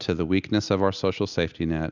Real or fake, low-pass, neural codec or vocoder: real; 7.2 kHz; none